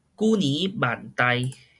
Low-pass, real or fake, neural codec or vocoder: 10.8 kHz; real; none